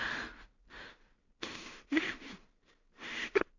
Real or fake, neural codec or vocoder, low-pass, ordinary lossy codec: fake; codec, 16 kHz in and 24 kHz out, 0.4 kbps, LongCat-Audio-Codec, two codebook decoder; 7.2 kHz; none